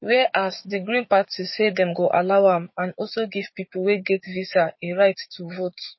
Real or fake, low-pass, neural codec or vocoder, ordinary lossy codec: fake; 7.2 kHz; codec, 16 kHz, 6 kbps, DAC; MP3, 24 kbps